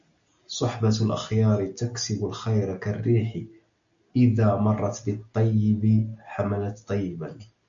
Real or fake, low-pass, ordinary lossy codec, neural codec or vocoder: real; 7.2 kHz; MP3, 64 kbps; none